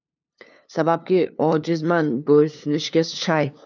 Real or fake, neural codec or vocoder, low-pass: fake; codec, 16 kHz, 2 kbps, FunCodec, trained on LibriTTS, 25 frames a second; 7.2 kHz